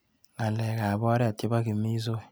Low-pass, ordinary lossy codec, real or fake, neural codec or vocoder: none; none; real; none